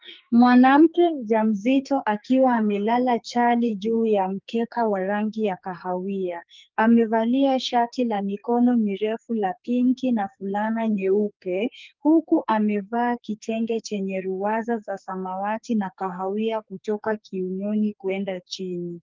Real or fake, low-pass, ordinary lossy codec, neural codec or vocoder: fake; 7.2 kHz; Opus, 32 kbps; codec, 44.1 kHz, 2.6 kbps, SNAC